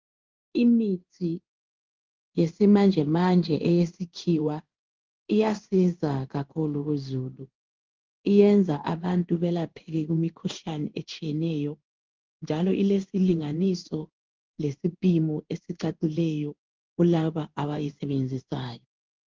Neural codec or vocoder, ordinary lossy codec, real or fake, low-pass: codec, 16 kHz in and 24 kHz out, 1 kbps, XY-Tokenizer; Opus, 24 kbps; fake; 7.2 kHz